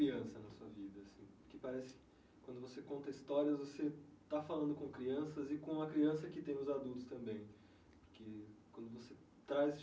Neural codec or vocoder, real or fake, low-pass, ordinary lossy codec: none; real; none; none